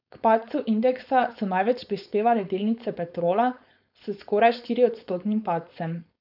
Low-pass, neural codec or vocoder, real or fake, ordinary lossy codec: 5.4 kHz; codec, 16 kHz, 4.8 kbps, FACodec; fake; none